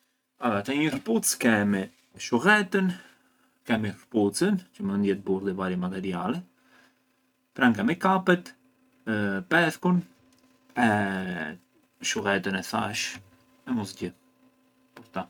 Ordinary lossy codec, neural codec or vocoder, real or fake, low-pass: none; none; real; 19.8 kHz